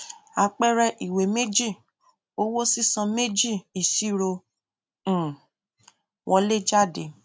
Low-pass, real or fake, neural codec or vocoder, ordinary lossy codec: none; real; none; none